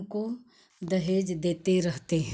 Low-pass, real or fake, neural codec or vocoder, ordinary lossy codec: none; real; none; none